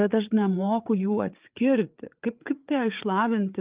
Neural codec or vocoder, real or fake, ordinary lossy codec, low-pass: codec, 16 kHz, 8 kbps, FreqCodec, larger model; fake; Opus, 24 kbps; 3.6 kHz